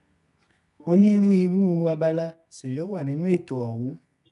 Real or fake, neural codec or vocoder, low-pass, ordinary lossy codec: fake; codec, 24 kHz, 0.9 kbps, WavTokenizer, medium music audio release; 10.8 kHz; none